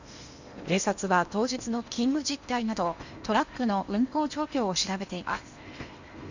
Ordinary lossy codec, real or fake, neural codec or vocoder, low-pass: Opus, 64 kbps; fake; codec, 16 kHz in and 24 kHz out, 0.8 kbps, FocalCodec, streaming, 65536 codes; 7.2 kHz